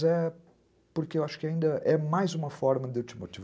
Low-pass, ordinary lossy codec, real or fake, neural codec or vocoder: none; none; real; none